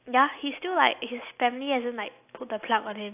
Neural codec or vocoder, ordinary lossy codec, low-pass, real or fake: none; AAC, 32 kbps; 3.6 kHz; real